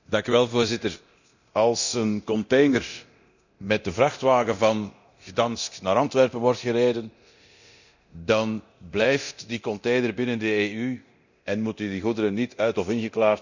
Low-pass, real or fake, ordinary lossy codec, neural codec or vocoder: 7.2 kHz; fake; none; codec, 24 kHz, 0.9 kbps, DualCodec